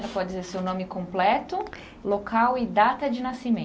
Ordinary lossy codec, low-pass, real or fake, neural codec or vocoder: none; none; real; none